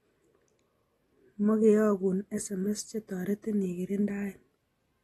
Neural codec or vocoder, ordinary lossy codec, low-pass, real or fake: none; AAC, 32 kbps; 19.8 kHz; real